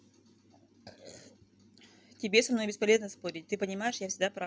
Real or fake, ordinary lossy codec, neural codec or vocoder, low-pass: real; none; none; none